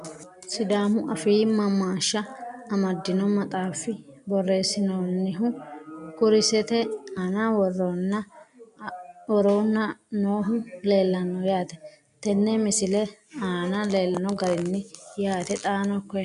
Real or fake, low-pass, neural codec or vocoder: real; 10.8 kHz; none